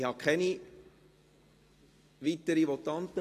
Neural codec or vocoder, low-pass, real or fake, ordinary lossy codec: none; 14.4 kHz; real; AAC, 48 kbps